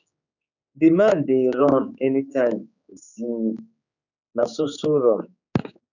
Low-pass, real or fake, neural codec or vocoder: 7.2 kHz; fake; codec, 16 kHz, 4 kbps, X-Codec, HuBERT features, trained on general audio